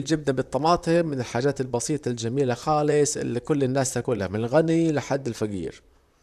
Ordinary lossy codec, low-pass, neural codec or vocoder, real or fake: none; 14.4 kHz; vocoder, 44.1 kHz, 128 mel bands, Pupu-Vocoder; fake